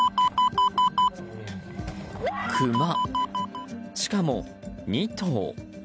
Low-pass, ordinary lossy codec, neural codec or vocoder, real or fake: none; none; none; real